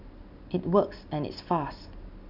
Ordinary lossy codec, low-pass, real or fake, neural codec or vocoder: none; 5.4 kHz; real; none